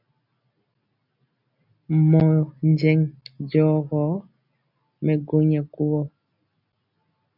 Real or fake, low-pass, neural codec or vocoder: real; 5.4 kHz; none